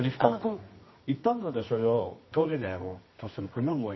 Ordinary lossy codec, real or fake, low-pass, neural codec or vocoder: MP3, 24 kbps; fake; 7.2 kHz; codec, 24 kHz, 0.9 kbps, WavTokenizer, medium music audio release